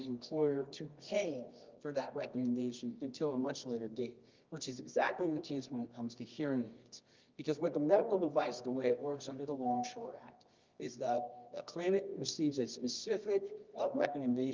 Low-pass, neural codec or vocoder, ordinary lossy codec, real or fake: 7.2 kHz; codec, 24 kHz, 0.9 kbps, WavTokenizer, medium music audio release; Opus, 24 kbps; fake